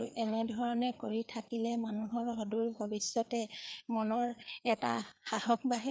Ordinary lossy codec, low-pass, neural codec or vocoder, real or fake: none; none; codec, 16 kHz, 4 kbps, FreqCodec, larger model; fake